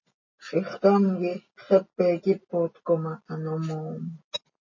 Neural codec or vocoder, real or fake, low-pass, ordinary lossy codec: none; real; 7.2 kHz; MP3, 32 kbps